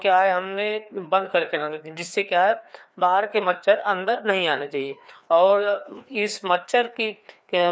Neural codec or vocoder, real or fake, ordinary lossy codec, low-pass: codec, 16 kHz, 2 kbps, FreqCodec, larger model; fake; none; none